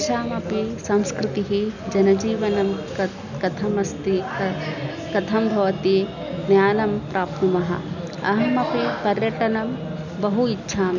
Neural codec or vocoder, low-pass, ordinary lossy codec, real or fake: none; 7.2 kHz; none; real